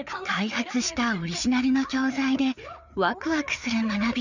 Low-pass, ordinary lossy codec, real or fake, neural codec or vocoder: 7.2 kHz; none; fake; codec, 16 kHz, 4 kbps, FreqCodec, larger model